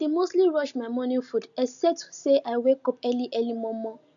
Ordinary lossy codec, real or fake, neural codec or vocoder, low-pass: none; real; none; 7.2 kHz